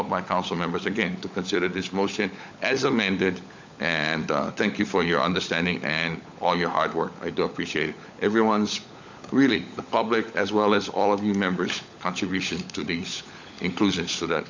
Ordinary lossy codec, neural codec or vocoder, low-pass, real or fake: MP3, 64 kbps; codec, 16 kHz, 16 kbps, FunCodec, trained on LibriTTS, 50 frames a second; 7.2 kHz; fake